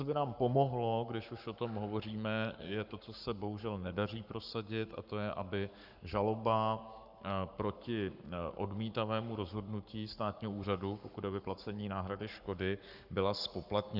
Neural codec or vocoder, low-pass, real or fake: codec, 44.1 kHz, 7.8 kbps, Pupu-Codec; 5.4 kHz; fake